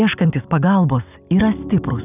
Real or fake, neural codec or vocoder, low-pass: real; none; 3.6 kHz